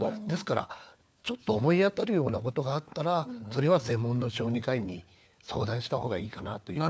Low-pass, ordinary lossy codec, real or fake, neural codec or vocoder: none; none; fake; codec, 16 kHz, 4 kbps, FunCodec, trained on LibriTTS, 50 frames a second